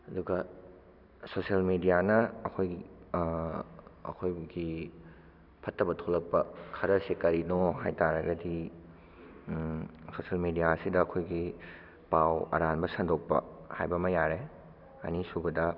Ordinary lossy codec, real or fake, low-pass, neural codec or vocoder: none; real; 5.4 kHz; none